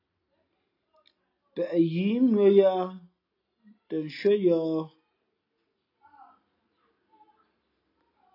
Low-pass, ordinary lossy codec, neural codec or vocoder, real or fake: 5.4 kHz; AAC, 32 kbps; none; real